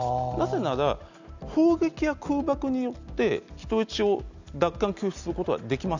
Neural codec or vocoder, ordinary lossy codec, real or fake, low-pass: none; none; real; 7.2 kHz